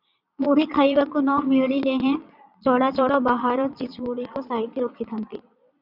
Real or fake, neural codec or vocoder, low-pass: fake; vocoder, 22.05 kHz, 80 mel bands, Vocos; 5.4 kHz